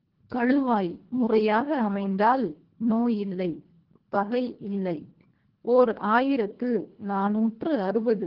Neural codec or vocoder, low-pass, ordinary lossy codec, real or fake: codec, 24 kHz, 1.5 kbps, HILCodec; 5.4 kHz; Opus, 16 kbps; fake